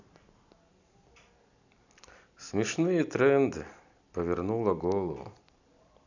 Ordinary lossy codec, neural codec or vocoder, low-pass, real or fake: none; none; 7.2 kHz; real